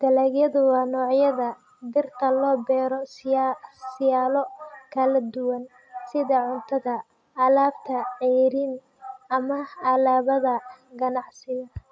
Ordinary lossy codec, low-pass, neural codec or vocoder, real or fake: none; none; none; real